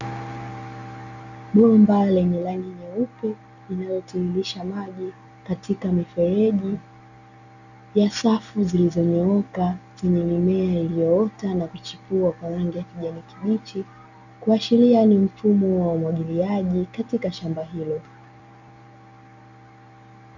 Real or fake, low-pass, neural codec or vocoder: real; 7.2 kHz; none